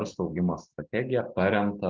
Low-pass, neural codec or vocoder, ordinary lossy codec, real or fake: 7.2 kHz; none; Opus, 24 kbps; real